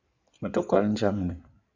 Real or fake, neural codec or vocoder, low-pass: fake; codec, 16 kHz in and 24 kHz out, 2.2 kbps, FireRedTTS-2 codec; 7.2 kHz